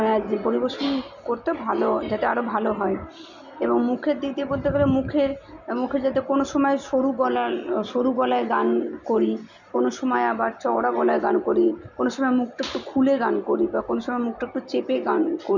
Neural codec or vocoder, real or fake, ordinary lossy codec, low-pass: none; real; none; 7.2 kHz